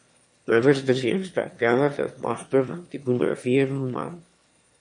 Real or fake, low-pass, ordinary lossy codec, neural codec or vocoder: fake; 9.9 kHz; MP3, 48 kbps; autoencoder, 22.05 kHz, a latent of 192 numbers a frame, VITS, trained on one speaker